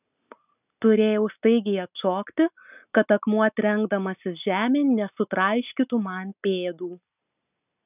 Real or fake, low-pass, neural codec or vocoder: real; 3.6 kHz; none